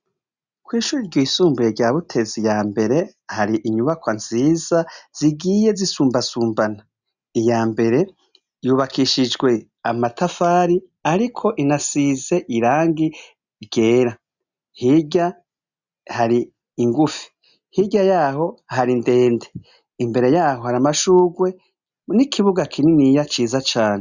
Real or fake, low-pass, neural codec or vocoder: real; 7.2 kHz; none